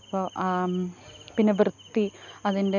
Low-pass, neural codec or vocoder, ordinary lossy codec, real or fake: 7.2 kHz; none; none; real